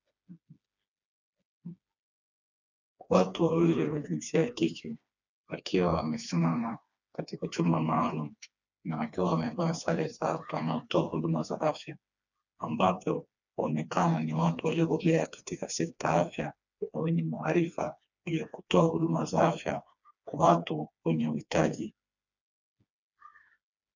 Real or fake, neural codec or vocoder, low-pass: fake; codec, 16 kHz, 2 kbps, FreqCodec, smaller model; 7.2 kHz